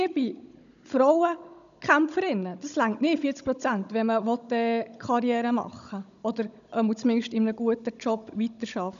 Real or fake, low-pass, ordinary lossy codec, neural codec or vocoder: fake; 7.2 kHz; none; codec, 16 kHz, 16 kbps, FunCodec, trained on Chinese and English, 50 frames a second